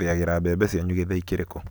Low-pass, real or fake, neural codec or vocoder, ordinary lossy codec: none; real; none; none